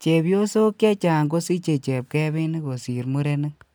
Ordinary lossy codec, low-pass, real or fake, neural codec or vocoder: none; none; real; none